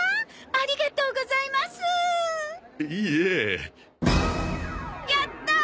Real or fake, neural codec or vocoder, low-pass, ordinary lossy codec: real; none; none; none